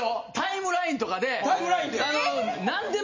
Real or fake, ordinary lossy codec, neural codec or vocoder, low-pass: real; MP3, 32 kbps; none; 7.2 kHz